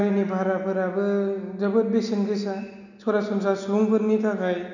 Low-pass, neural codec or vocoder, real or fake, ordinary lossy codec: 7.2 kHz; none; real; none